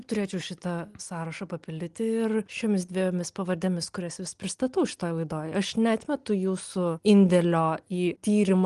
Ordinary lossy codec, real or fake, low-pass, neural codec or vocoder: Opus, 24 kbps; real; 10.8 kHz; none